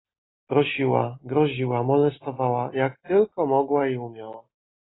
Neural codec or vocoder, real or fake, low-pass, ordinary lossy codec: none; real; 7.2 kHz; AAC, 16 kbps